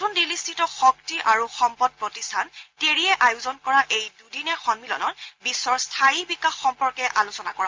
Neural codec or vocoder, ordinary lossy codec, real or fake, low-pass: none; Opus, 24 kbps; real; 7.2 kHz